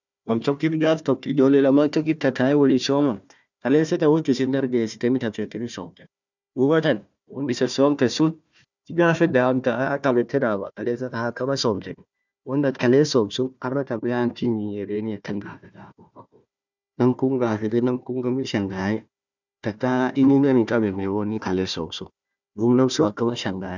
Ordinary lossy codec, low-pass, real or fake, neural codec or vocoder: none; 7.2 kHz; fake; codec, 16 kHz, 1 kbps, FunCodec, trained on Chinese and English, 50 frames a second